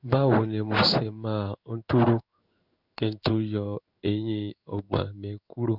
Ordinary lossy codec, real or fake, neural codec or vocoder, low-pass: none; fake; codec, 16 kHz in and 24 kHz out, 1 kbps, XY-Tokenizer; 5.4 kHz